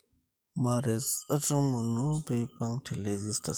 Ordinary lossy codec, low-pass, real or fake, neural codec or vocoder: none; none; fake; codec, 44.1 kHz, 7.8 kbps, DAC